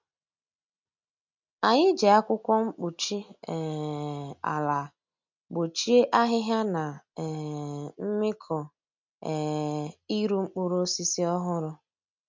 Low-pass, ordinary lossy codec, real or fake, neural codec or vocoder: 7.2 kHz; MP3, 64 kbps; real; none